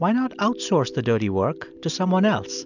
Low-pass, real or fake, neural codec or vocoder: 7.2 kHz; real; none